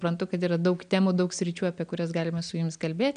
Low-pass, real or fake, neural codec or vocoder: 9.9 kHz; real; none